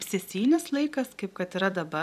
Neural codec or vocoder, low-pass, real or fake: vocoder, 44.1 kHz, 128 mel bands every 256 samples, BigVGAN v2; 14.4 kHz; fake